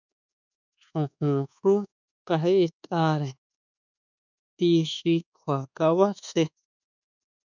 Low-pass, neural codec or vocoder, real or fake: 7.2 kHz; codec, 24 kHz, 1.2 kbps, DualCodec; fake